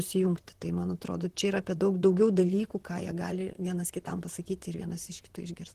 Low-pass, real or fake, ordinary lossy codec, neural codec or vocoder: 14.4 kHz; fake; Opus, 16 kbps; vocoder, 44.1 kHz, 128 mel bands, Pupu-Vocoder